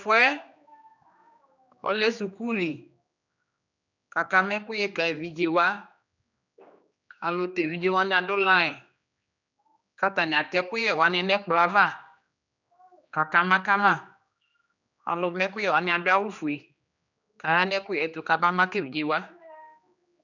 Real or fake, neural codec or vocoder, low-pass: fake; codec, 16 kHz, 2 kbps, X-Codec, HuBERT features, trained on general audio; 7.2 kHz